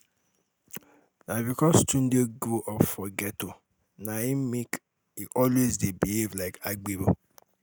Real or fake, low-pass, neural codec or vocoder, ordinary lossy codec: real; none; none; none